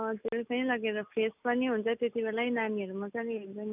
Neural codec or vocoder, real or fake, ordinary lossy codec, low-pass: none; real; none; 3.6 kHz